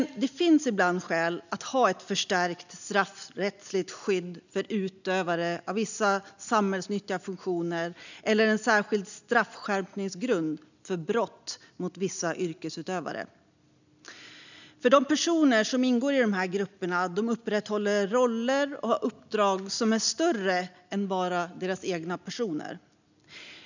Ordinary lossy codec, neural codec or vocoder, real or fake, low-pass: none; none; real; 7.2 kHz